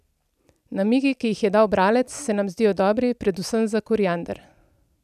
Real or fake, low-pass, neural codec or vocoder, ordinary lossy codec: real; 14.4 kHz; none; none